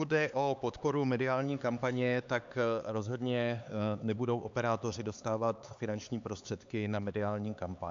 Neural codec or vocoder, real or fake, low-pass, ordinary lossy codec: codec, 16 kHz, 4 kbps, X-Codec, HuBERT features, trained on LibriSpeech; fake; 7.2 kHz; AAC, 64 kbps